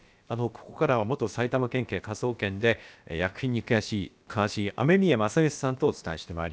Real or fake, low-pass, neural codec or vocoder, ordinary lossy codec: fake; none; codec, 16 kHz, about 1 kbps, DyCAST, with the encoder's durations; none